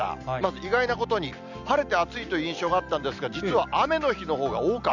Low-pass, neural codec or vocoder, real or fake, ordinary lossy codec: 7.2 kHz; none; real; none